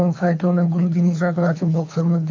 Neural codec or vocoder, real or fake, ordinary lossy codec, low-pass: codec, 24 kHz, 3 kbps, HILCodec; fake; MP3, 48 kbps; 7.2 kHz